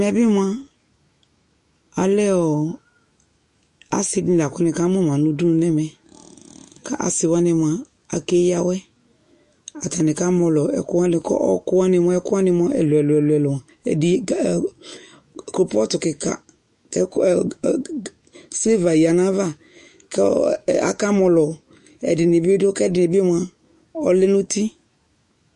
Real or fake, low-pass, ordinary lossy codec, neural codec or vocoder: fake; 14.4 kHz; MP3, 48 kbps; autoencoder, 48 kHz, 128 numbers a frame, DAC-VAE, trained on Japanese speech